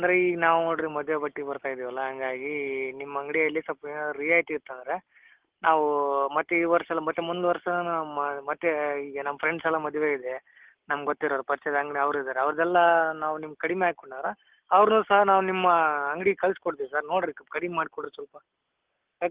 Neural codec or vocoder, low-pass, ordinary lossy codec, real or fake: none; 3.6 kHz; Opus, 16 kbps; real